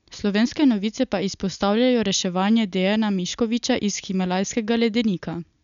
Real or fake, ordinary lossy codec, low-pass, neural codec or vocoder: real; none; 7.2 kHz; none